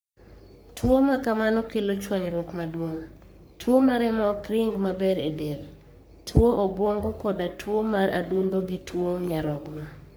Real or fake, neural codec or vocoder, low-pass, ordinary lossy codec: fake; codec, 44.1 kHz, 3.4 kbps, Pupu-Codec; none; none